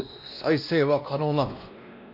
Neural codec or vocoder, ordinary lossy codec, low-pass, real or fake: codec, 16 kHz, 1 kbps, X-Codec, WavLM features, trained on Multilingual LibriSpeech; none; 5.4 kHz; fake